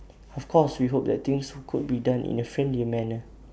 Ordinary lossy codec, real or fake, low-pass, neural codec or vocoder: none; real; none; none